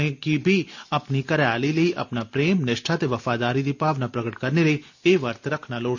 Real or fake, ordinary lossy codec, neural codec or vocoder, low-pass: real; none; none; 7.2 kHz